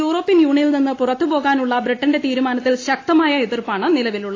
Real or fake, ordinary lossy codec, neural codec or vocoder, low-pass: real; AAC, 32 kbps; none; 7.2 kHz